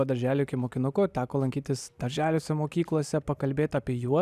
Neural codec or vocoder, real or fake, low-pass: none; real; 14.4 kHz